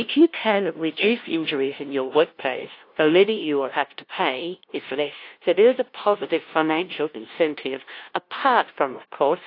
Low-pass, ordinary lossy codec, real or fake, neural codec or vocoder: 5.4 kHz; AAC, 32 kbps; fake; codec, 16 kHz, 0.5 kbps, FunCodec, trained on LibriTTS, 25 frames a second